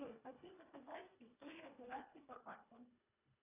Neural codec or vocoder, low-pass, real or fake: codec, 24 kHz, 1.5 kbps, HILCodec; 3.6 kHz; fake